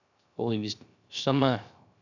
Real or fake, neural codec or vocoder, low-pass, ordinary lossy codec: fake; codec, 16 kHz, 0.3 kbps, FocalCodec; 7.2 kHz; none